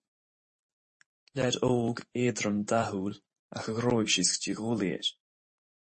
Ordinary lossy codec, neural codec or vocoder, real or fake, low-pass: MP3, 32 kbps; vocoder, 44.1 kHz, 128 mel bands every 512 samples, BigVGAN v2; fake; 10.8 kHz